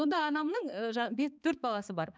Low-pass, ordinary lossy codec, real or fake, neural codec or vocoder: none; none; fake; codec, 16 kHz, 4 kbps, X-Codec, HuBERT features, trained on balanced general audio